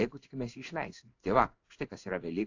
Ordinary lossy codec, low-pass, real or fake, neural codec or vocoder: AAC, 48 kbps; 7.2 kHz; fake; codec, 16 kHz in and 24 kHz out, 1 kbps, XY-Tokenizer